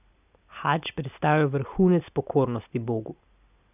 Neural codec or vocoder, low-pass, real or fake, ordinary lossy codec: none; 3.6 kHz; real; none